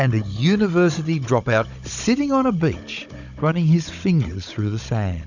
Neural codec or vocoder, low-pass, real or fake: codec, 16 kHz, 16 kbps, FunCodec, trained on Chinese and English, 50 frames a second; 7.2 kHz; fake